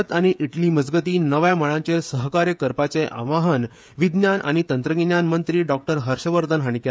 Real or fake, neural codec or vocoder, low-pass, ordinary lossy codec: fake; codec, 16 kHz, 16 kbps, FreqCodec, smaller model; none; none